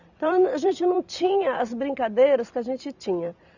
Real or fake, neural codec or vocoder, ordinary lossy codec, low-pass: fake; vocoder, 44.1 kHz, 128 mel bands every 256 samples, BigVGAN v2; none; 7.2 kHz